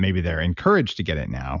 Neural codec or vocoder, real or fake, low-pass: none; real; 7.2 kHz